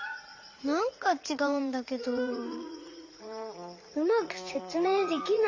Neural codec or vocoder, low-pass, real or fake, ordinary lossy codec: vocoder, 44.1 kHz, 80 mel bands, Vocos; 7.2 kHz; fake; Opus, 32 kbps